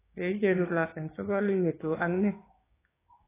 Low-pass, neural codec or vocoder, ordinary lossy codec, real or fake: 3.6 kHz; codec, 16 kHz, 6 kbps, DAC; AAC, 16 kbps; fake